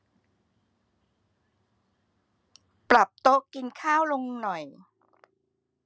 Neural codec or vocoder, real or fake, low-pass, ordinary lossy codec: none; real; none; none